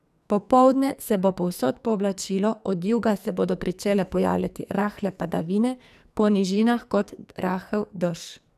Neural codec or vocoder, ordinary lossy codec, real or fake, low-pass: codec, 44.1 kHz, 2.6 kbps, SNAC; none; fake; 14.4 kHz